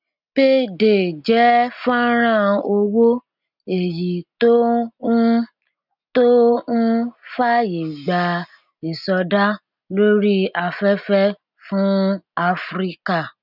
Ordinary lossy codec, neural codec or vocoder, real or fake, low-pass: none; none; real; 5.4 kHz